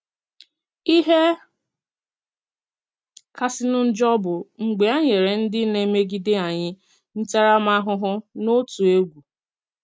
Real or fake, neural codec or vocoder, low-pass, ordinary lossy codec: real; none; none; none